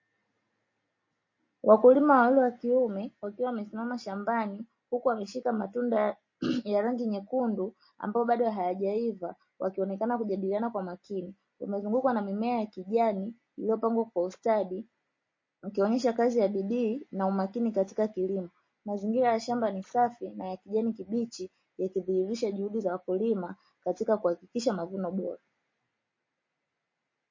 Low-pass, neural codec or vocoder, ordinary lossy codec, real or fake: 7.2 kHz; none; MP3, 32 kbps; real